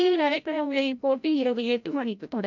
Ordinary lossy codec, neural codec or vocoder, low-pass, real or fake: none; codec, 16 kHz, 0.5 kbps, FreqCodec, larger model; 7.2 kHz; fake